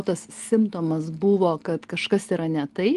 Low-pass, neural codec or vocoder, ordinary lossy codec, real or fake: 10.8 kHz; none; Opus, 24 kbps; real